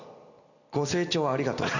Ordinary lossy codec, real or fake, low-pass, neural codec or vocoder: none; real; 7.2 kHz; none